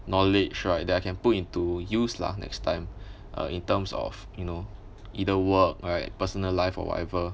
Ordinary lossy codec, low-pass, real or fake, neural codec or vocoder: none; none; real; none